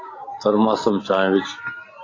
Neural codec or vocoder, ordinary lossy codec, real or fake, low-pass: none; AAC, 32 kbps; real; 7.2 kHz